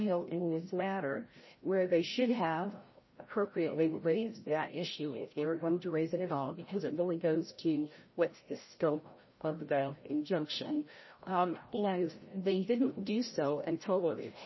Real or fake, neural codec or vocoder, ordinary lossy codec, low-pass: fake; codec, 16 kHz, 0.5 kbps, FreqCodec, larger model; MP3, 24 kbps; 7.2 kHz